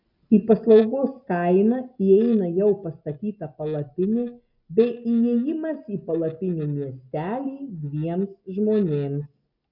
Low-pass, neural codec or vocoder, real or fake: 5.4 kHz; none; real